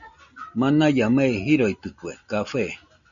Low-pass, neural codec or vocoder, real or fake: 7.2 kHz; none; real